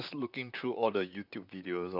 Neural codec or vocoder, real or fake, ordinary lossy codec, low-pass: vocoder, 44.1 kHz, 128 mel bands, Pupu-Vocoder; fake; none; 5.4 kHz